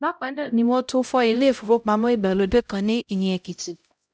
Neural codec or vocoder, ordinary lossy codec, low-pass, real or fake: codec, 16 kHz, 0.5 kbps, X-Codec, HuBERT features, trained on LibriSpeech; none; none; fake